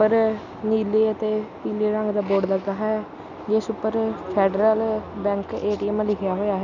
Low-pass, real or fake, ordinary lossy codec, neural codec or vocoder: 7.2 kHz; real; none; none